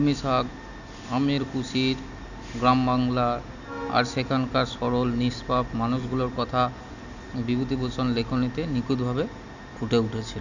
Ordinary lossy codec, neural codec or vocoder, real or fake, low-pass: none; none; real; 7.2 kHz